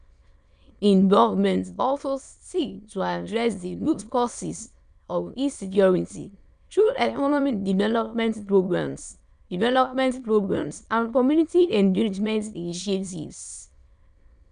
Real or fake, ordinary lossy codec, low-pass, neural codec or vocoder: fake; none; 9.9 kHz; autoencoder, 22.05 kHz, a latent of 192 numbers a frame, VITS, trained on many speakers